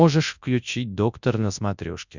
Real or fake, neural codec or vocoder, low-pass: fake; codec, 24 kHz, 0.9 kbps, WavTokenizer, large speech release; 7.2 kHz